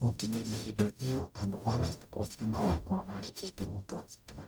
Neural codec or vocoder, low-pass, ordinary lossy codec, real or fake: codec, 44.1 kHz, 0.9 kbps, DAC; none; none; fake